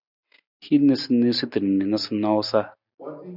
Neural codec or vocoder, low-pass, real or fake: none; 5.4 kHz; real